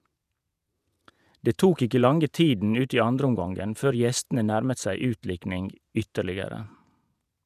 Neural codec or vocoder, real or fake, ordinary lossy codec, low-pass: vocoder, 48 kHz, 128 mel bands, Vocos; fake; none; 14.4 kHz